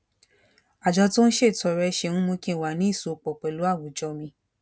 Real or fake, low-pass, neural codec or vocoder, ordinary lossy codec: real; none; none; none